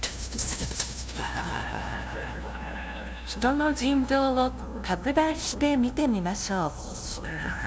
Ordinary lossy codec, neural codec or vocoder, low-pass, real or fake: none; codec, 16 kHz, 0.5 kbps, FunCodec, trained on LibriTTS, 25 frames a second; none; fake